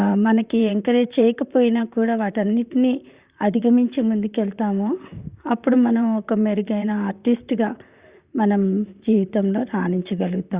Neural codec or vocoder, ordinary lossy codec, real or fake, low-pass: vocoder, 44.1 kHz, 128 mel bands, Pupu-Vocoder; Opus, 64 kbps; fake; 3.6 kHz